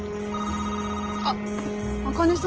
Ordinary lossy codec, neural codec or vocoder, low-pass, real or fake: Opus, 16 kbps; none; 7.2 kHz; real